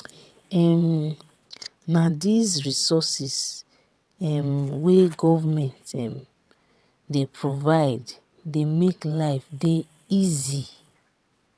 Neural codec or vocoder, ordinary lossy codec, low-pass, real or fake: vocoder, 22.05 kHz, 80 mel bands, WaveNeXt; none; none; fake